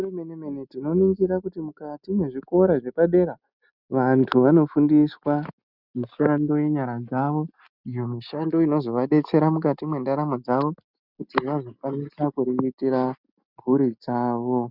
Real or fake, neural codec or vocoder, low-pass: real; none; 5.4 kHz